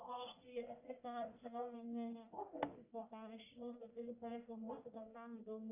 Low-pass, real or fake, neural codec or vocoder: 3.6 kHz; fake; codec, 44.1 kHz, 1.7 kbps, Pupu-Codec